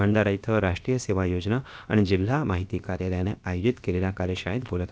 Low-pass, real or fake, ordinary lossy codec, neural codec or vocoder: none; fake; none; codec, 16 kHz, about 1 kbps, DyCAST, with the encoder's durations